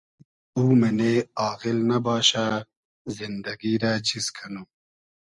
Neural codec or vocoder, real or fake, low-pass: none; real; 10.8 kHz